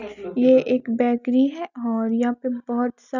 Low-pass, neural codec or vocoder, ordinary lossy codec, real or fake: 7.2 kHz; none; none; real